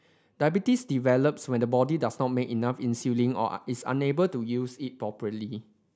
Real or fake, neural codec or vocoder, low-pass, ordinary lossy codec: real; none; none; none